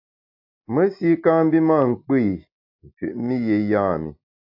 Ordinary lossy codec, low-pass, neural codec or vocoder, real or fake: AAC, 48 kbps; 5.4 kHz; none; real